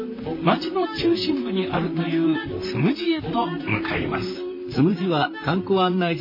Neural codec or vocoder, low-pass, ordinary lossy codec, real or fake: vocoder, 44.1 kHz, 128 mel bands, Pupu-Vocoder; 5.4 kHz; MP3, 24 kbps; fake